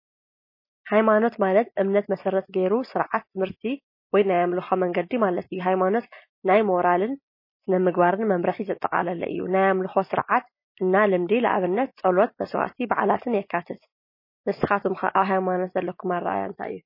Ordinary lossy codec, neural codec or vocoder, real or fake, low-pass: MP3, 24 kbps; none; real; 5.4 kHz